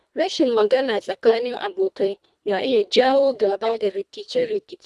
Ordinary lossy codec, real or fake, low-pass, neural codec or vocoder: none; fake; none; codec, 24 kHz, 1.5 kbps, HILCodec